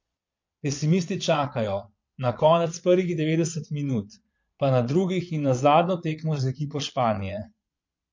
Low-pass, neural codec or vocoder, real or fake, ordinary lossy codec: 7.2 kHz; vocoder, 22.05 kHz, 80 mel bands, Vocos; fake; MP3, 48 kbps